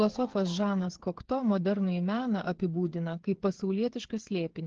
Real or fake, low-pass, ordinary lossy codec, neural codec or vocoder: fake; 7.2 kHz; Opus, 16 kbps; codec, 16 kHz, 8 kbps, FreqCodec, smaller model